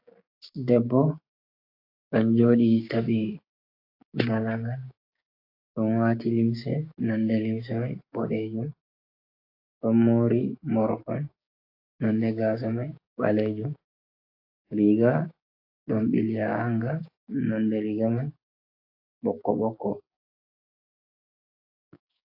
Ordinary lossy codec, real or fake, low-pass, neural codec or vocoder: AAC, 32 kbps; fake; 5.4 kHz; codec, 16 kHz, 6 kbps, DAC